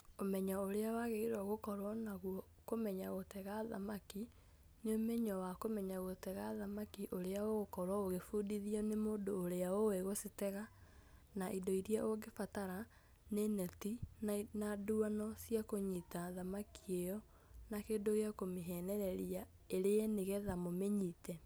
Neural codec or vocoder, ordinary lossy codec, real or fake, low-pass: none; none; real; none